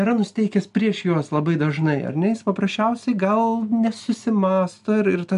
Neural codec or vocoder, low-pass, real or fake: none; 10.8 kHz; real